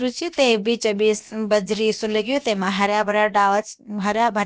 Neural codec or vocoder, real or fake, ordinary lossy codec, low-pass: codec, 16 kHz, about 1 kbps, DyCAST, with the encoder's durations; fake; none; none